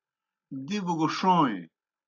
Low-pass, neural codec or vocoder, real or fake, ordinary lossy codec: 7.2 kHz; none; real; AAC, 48 kbps